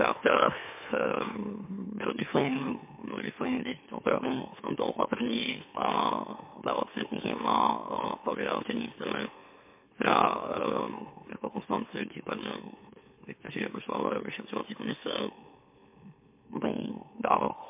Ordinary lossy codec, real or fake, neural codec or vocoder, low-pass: MP3, 24 kbps; fake; autoencoder, 44.1 kHz, a latent of 192 numbers a frame, MeloTTS; 3.6 kHz